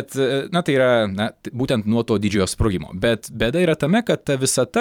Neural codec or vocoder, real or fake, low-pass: vocoder, 44.1 kHz, 128 mel bands every 512 samples, BigVGAN v2; fake; 19.8 kHz